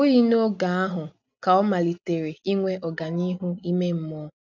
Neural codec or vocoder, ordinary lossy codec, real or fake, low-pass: none; none; real; 7.2 kHz